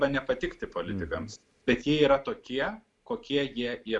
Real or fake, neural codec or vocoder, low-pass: real; none; 10.8 kHz